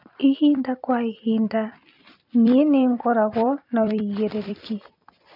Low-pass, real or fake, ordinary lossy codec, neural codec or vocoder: 5.4 kHz; fake; none; vocoder, 44.1 kHz, 128 mel bands every 256 samples, BigVGAN v2